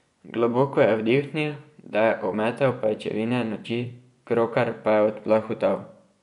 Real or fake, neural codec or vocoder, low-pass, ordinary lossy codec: fake; vocoder, 24 kHz, 100 mel bands, Vocos; 10.8 kHz; none